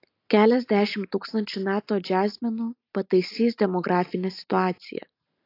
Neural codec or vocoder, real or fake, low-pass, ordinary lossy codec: none; real; 5.4 kHz; AAC, 32 kbps